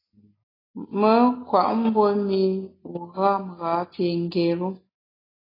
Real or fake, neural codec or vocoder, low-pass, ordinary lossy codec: real; none; 5.4 kHz; AAC, 24 kbps